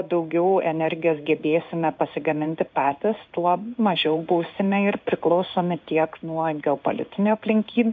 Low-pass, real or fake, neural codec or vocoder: 7.2 kHz; fake; codec, 16 kHz in and 24 kHz out, 1 kbps, XY-Tokenizer